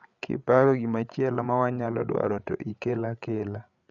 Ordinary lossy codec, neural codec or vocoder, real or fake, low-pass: none; codec, 16 kHz, 8 kbps, FreqCodec, larger model; fake; 7.2 kHz